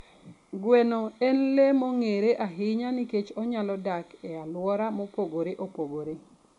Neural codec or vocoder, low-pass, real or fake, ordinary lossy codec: none; 10.8 kHz; real; none